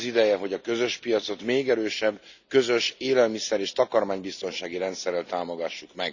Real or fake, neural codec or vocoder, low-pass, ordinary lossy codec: real; none; 7.2 kHz; none